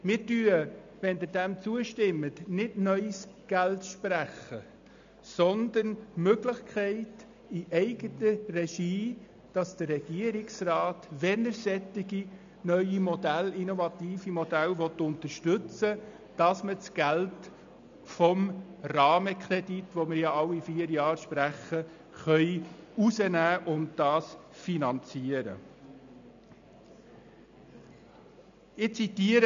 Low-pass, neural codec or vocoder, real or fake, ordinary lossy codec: 7.2 kHz; none; real; MP3, 64 kbps